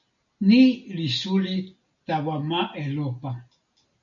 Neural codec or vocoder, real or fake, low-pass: none; real; 7.2 kHz